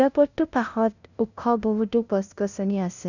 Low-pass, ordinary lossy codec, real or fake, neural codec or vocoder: 7.2 kHz; none; fake; codec, 16 kHz, 0.5 kbps, FunCodec, trained on LibriTTS, 25 frames a second